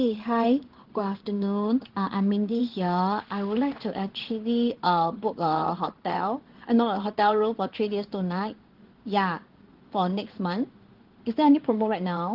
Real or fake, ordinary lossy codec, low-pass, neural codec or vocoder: fake; Opus, 16 kbps; 5.4 kHz; codec, 16 kHz in and 24 kHz out, 1 kbps, XY-Tokenizer